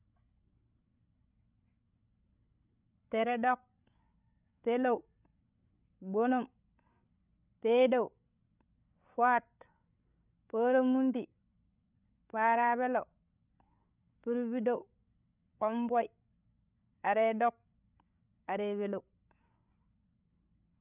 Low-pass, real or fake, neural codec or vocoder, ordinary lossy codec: 3.6 kHz; fake; codec, 16 kHz, 16 kbps, FreqCodec, larger model; none